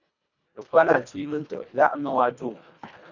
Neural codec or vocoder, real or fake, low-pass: codec, 24 kHz, 1.5 kbps, HILCodec; fake; 7.2 kHz